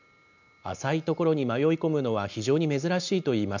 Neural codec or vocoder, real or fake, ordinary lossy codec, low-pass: none; real; none; 7.2 kHz